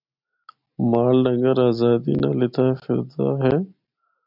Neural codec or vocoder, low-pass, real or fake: none; 5.4 kHz; real